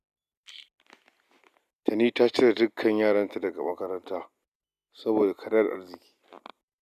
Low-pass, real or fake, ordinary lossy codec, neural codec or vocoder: 14.4 kHz; real; none; none